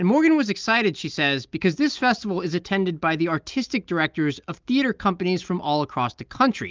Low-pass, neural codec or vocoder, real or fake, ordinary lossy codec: 7.2 kHz; none; real; Opus, 24 kbps